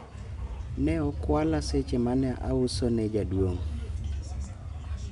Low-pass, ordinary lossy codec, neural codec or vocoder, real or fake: 10.8 kHz; none; none; real